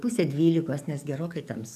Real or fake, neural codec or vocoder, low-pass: fake; codec, 44.1 kHz, 7.8 kbps, DAC; 14.4 kHz